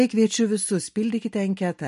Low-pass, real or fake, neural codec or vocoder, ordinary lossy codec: 14.4 kHz; real; none; MP3, 48 kbps